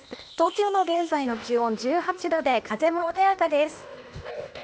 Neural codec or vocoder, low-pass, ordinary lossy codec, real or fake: codec, 16 kHz, 0.8 kbps, ZipCodec; none; none; fake